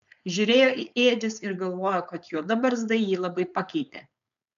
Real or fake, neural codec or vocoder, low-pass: fake; codec, 16 kHz, 4.8 kbps, FACodec; 7.2 kHz